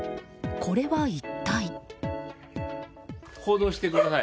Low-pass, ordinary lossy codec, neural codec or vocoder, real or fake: none; none; none; real